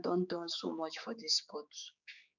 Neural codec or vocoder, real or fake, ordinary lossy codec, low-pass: codec, 16 kHz, 4 kbps, X-Codec, HuBERT features, trained on general audio; fake; none; 7.2 kHz